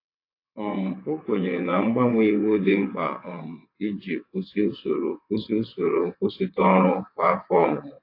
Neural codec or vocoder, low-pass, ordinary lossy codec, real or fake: vocoder, 22.05 kHz, 80 mel bands, WaveNeXt; 5.4 kHz; AAC, 32 kbps; fake